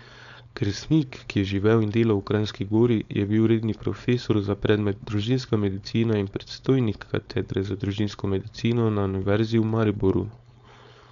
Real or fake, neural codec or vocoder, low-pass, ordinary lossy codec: fake; codec, 16 kHz, 4.8 kbps, FACodec; 7.2 kHz; none